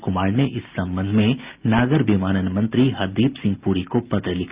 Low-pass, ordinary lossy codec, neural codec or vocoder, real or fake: 3.6 kHz; Opus, 32 kbps; none; real